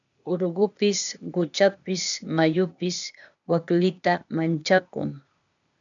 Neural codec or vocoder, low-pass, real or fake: codec, 16 kHz, 0.8 kbps, ZipCodec; 7.2 kHz; fake